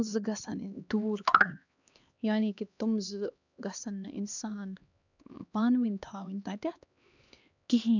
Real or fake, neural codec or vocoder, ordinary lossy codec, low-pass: fake; codec, 16 kHz, 2 kbps, X-Codec, HuBERT features, trained on LibriSpeech; none; 7.2 kHz